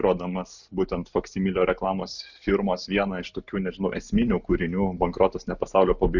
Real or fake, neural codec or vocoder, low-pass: real; none; 7.2 kHz